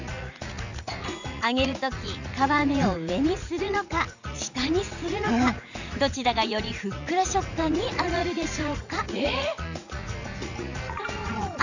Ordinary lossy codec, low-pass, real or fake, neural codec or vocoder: none; 7.2 kHz; fake; vocoder, 44.1 kHz, 80 mel bands, Vocos